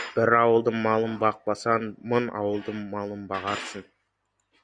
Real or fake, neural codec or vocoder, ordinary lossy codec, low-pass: real; none; Opus, 64 kbps; 9.9 kHz